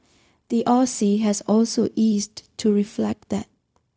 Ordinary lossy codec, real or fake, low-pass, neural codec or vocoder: none; fake; none; codec, 16 kHz, 0.4 kbps, LongCat-Audio-Codec